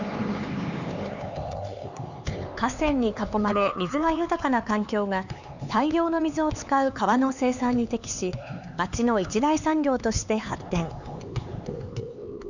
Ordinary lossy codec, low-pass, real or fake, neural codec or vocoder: none; 7.2 kHz; fake; codec, 16 kHz, 4 kbps, X-Codec, HuBERT features, trained on LibriSpeech